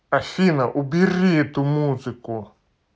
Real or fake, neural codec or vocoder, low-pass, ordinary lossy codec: real; none; none; none